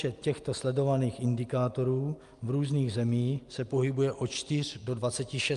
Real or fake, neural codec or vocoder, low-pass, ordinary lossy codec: real; none; 10.8 kHz; Opus, 32 kbps